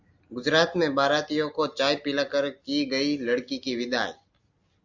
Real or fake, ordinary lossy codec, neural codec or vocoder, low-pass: real; Opus, 64 kbps; none; 7.2 kHz